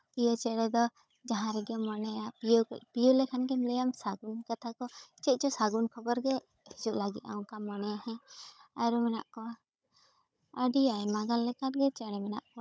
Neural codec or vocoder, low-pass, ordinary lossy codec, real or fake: codec, 16 kHz, 16 kbps, FunCodec, trained on Chinese and English, 50 frames a second; none; none; fake